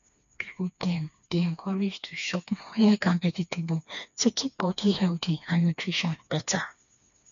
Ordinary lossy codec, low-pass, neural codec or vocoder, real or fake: none; 7.2 kHz; codec, 16 kHz, 2 kbps, FreqCodec, smaller model; fake